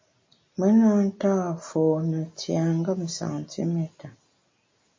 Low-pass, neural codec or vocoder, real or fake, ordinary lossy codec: 7.2 kHz; none; real; MP3, 32 kbps